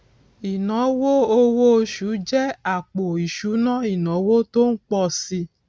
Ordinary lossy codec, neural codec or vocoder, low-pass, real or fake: none; none; none; real